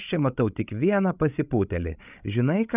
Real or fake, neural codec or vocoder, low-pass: fake; codec, 16 kHz, 16 kbps, FreqCodec, larger model; 3.6 kHz